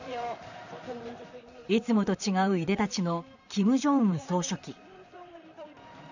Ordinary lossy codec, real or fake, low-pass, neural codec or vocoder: none; fake; 7.2 kHz; vocoder, 44.1 kHz, 128 mel bands, Pupu-Vocoder